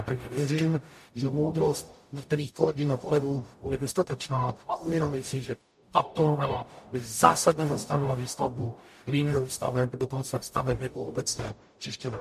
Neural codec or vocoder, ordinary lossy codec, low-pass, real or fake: codec, 44.1 kHz, 0.9 kbps, DAC; MP3, 64 kbps; 14.4 kHz; fake